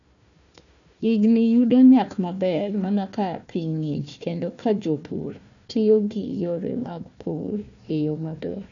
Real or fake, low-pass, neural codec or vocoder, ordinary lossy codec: fake; 7.2 kHz; codec, 16 kHz, 1 kbps, FunCodec, trained on Chinese and English, 50 frames a second; none